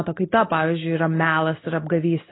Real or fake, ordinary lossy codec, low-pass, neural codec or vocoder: real; AAC, 16 kbps; 7.2 kHz; none